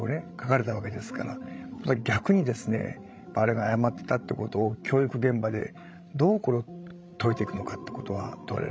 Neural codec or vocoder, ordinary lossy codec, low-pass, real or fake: codec, 16 kHz, 16 kbps, FreqCodec, larger model; none; none; fake